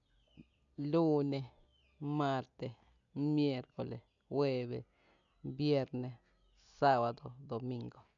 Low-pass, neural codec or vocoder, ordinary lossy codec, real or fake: 7.2 kHz; none; none; real